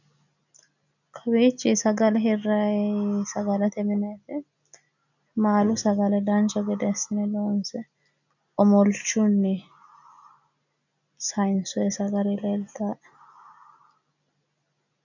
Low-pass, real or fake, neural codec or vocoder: 7.2 kHz; real; none